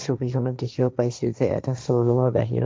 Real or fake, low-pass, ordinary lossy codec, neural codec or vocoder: fake; none; none; codec, 16 kHz, 1.1 kbps, Voila-Tokenizer